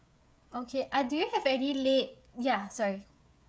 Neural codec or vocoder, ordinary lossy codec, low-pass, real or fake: codec, 16 kHz, 16 kbps, FreqCodec, smaller model; none; none; fake